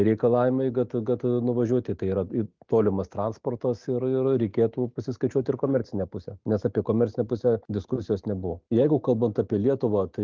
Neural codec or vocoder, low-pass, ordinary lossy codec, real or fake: none; 7.2 kHz; Opus, 32 kbps; real